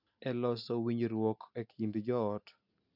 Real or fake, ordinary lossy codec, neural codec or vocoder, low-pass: real; none; none; 5.4 kHz